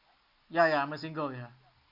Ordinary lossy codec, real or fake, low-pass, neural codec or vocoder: Opus, 64 kbps; real; 5.4 kHz; none